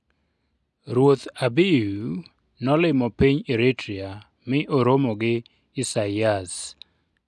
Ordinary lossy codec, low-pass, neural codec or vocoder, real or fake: none; none; none; real